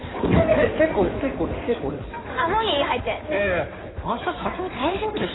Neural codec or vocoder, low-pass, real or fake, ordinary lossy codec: codec, 16 kHz in and 24 kHz out, 2.2 kbps, FireRedTTS-2 codec; 7.2 kHz; fake; AAC, 16 kbps